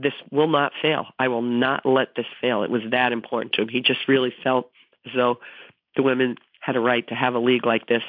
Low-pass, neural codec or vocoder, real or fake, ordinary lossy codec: 5.4 kHz; none; real; MP3, 48 kbps